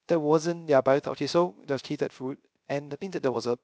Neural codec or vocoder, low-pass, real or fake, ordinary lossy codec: codec, 16 kHz, 0.3 kbps, FocalCodec; none; fake; none